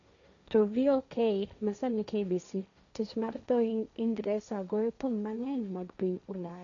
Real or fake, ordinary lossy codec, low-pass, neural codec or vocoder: fake; none; 7.2 kHz; codec, 16 kHz, 1.1 kbps, Voila-Tokenizer